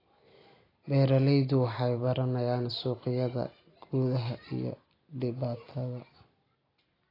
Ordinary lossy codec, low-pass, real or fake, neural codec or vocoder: AAC, 24 kbps; 5.4 kHz; real; none